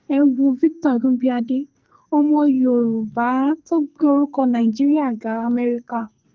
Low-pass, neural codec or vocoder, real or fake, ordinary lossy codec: 7.2 kHz; codec, 44.1 kHz, 2.6 kbps, SNAC; fake; Opus, 32 kbps